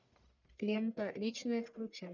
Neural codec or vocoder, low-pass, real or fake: codec, 44.1 kHz, 1.7 kbps, Pupu-Codec; 7.2 kHz; fake